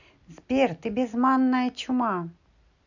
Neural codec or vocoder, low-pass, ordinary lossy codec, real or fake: none; 7.2 kHz; none; real